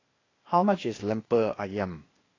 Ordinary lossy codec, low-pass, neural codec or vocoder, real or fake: AAC, 32 kbps; 7.2 kHz; codec, 16 kHz, 0.8 kbps, ZipCodec; fake